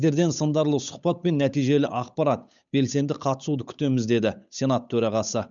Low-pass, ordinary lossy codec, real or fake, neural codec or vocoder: 7.2 kHz; none; fake; codec, 16 kHz, 8 kbps, FunCodec, trained on Chinese and English, 25 frames a second